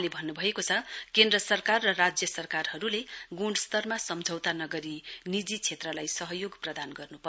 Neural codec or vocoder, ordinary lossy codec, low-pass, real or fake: none; none; none; real